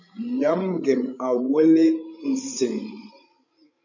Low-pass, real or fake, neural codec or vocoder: 7.2 kHz; fake; codec, 16 kHz, 16 kbps, FreqCodec, larger model